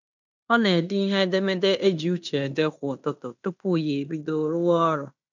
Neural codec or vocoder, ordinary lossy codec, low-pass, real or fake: codec, 16 kHz in and 24 kHz out, 0.9 kbps, LongCat-Audio-Codec, fine tuned four codebook decoder; none; 7.2 kHz; fake